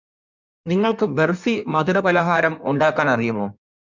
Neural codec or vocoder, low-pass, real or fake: codec, 16 kHz in and 24 kHz out, 1.1 kbps, FireRedTTS-2 codec; 7.2 kHz; fake